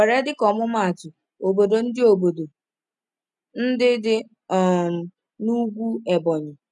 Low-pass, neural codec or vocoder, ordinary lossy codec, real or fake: 10.8 kHz; none; none; real